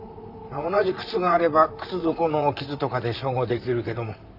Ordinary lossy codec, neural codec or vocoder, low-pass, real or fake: none; vocoder, 44.1 kHz, 128 mel bands, Pupu-Vocoder; 5.4 kHz; fake